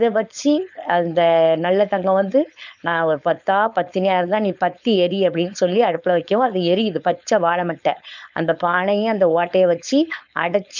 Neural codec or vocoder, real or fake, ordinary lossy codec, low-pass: codec, 16 kHz, 4.8 kbps, FACodec; fake; none; 7.2 kHz